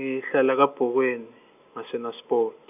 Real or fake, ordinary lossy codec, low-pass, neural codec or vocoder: real; none; 3.6 kHz; none